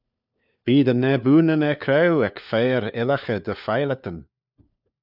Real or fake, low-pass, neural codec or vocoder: fake; 5.4 kHz; codec, 16 kHz, 4 kbps, FunCodec, trained on LibriTTS, 50 frames a second